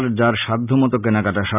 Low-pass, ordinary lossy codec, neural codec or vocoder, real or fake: 3.6 kHz; none; none; real